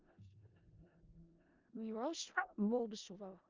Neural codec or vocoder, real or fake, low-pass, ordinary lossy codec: codec, 16 kHz in and 24 kHz out, 0.4 kbps, LongCat-Audio-Codec, four codebook decoder; fake; 7.2 kHz; Opus, 32 kbps